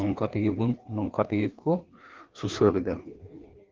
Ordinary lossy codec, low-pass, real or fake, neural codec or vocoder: Opus, 16 kbps; 7.2 kHz; fake; codec, 16 kHz, 2 kbps, FreqCodec, larger model